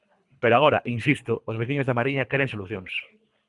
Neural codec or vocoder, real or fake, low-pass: codec, 24 kHz, 3 kbps, HILCodec; fake; 10.8 kHz